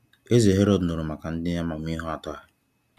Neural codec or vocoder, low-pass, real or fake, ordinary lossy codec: none; 14.4 kHz; real; none